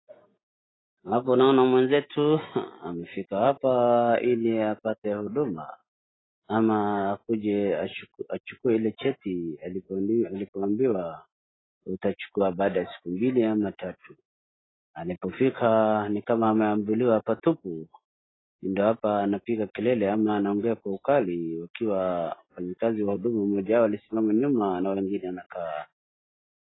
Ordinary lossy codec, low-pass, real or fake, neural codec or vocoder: AAC, 16 kbps; 7.2 kHz; real; none